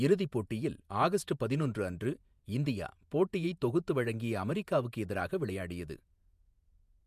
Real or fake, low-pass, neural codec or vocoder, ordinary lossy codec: fake; 14.4 kHz; vocoder, 48 kHz, 128 mel bands, Vocos; MP3, 96 kbps